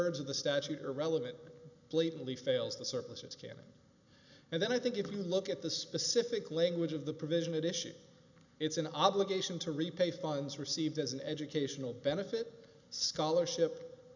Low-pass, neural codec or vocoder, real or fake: 7.2 kHz; none; real